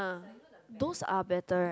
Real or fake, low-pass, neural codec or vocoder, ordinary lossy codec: real; none; none; none